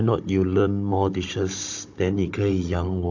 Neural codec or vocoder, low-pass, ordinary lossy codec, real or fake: codec, 16 kHz, 16 kbps, FunCodec, trained on Chinese and English, 50 frames a second; 7.2 kHz; AAC, 48 kbps; fake